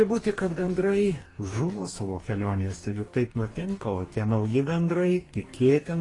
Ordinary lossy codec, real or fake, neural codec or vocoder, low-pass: AAC, 32 kbps; fake; codec, 44.1 kHz, 2.6 kbps, DAC; 10.8 kHz